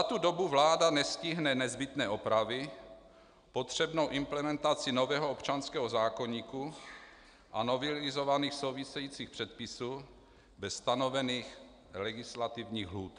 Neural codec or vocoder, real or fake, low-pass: none; real; 9.9 kHz